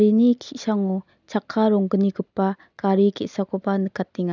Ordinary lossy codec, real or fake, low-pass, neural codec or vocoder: none; real; 7.2 kHz; none